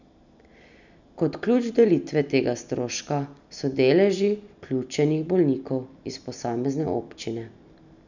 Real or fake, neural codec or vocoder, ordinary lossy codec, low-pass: real; none; none; 7.2 kHz